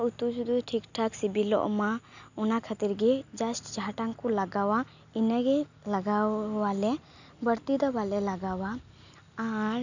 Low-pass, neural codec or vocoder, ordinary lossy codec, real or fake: 7.2 kHz; none; none; real